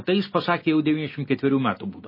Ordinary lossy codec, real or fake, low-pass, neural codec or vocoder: MP3, 24 kbps; real; 5.4 kHz; none